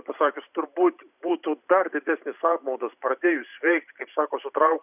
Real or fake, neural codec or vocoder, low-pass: fake; vocoder, 44.1 kHz, 128 mel bands every 512 samples, BigVGAN v2; 3.6 kHz